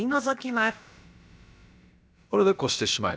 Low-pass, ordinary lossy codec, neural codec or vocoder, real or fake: none; none; codec, 16 kHz, about 1 kbps, DyCAST, with the encoder's durations; fake